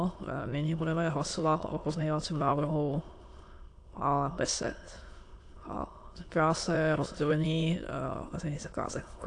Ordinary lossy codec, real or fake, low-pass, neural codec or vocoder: AAC, 48 kbps; fake; 9.9 kHz; autoencoder, 22.05 kHz, a latent of 192 numbers a frame, VITS, trained on many speakers